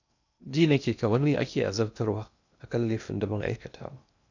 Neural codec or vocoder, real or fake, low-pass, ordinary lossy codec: codec, 16 kHz in and 24 kHz out, 0.8 kbps, FocalCodec, streaming, 65536 codes; fake; 7.2 kHz; none